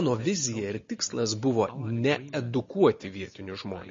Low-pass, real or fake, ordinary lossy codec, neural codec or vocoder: 7.2 kHz; real; MP3, 32 kbps; none